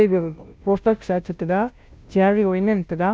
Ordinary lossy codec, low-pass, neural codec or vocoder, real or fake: none; none; codec, 16 kHz, 0.5 kbps, FunCodec, trained on Chinese and English, 25 frames a second; fake